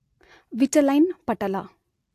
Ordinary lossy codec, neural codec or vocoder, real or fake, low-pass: AAC, 64 kbps; none; real; 14.4 kHz